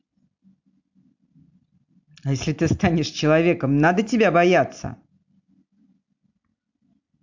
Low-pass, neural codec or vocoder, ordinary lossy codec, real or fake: 7.2 kHz; none; MP3, 64 kbps; real